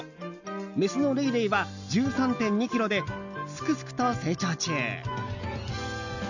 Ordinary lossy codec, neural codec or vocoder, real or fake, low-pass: none; none; real; 7.2 kHz